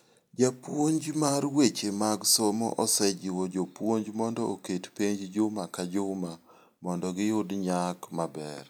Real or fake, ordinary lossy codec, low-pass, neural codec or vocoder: real; none; none; none